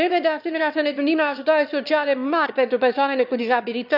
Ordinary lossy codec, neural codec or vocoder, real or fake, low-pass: none; autoencoder, 22.05 kHz, a latent of 192 numbers a frame, VITS, trained on one speaker; fake; 5.4 kHz